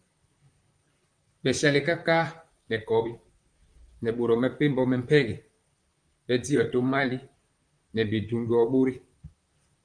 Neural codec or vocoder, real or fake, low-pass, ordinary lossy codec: vocoder, 44.1 kHz, 128 mel bands, Pupu-Vocoder; fake; 9.9 kHz; Opus, 32 kbps